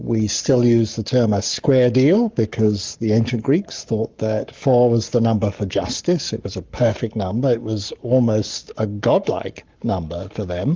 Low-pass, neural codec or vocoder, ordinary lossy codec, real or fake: 7.2 kHz; codec, 44.1 kHz, 7.8 kbps, Pupu-Codec; Opus, 24 kbps; fake